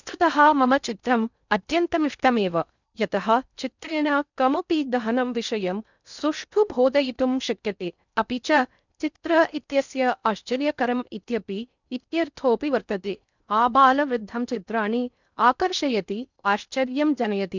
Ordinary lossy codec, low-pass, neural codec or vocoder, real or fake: none; 7.2 kHz; codec, 16 kHz in and 24 kHz out, 0.6 kbps, FocalCodec, streaming, 4096 codes; fake